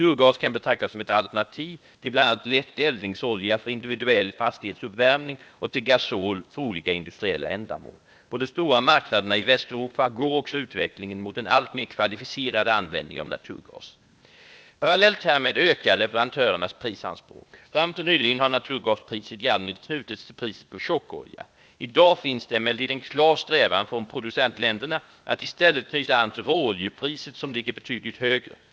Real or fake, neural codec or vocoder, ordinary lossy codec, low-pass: fake; codec, 16 kHz, 0.8 kbps, ZipCodec; none; none